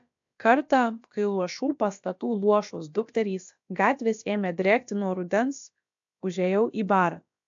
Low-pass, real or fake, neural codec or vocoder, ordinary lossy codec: 7.2 kHz; fake; codec, 16 kHz, about 1 kbps, DyCAST, with the encoder's durations; AAC, 64 kbps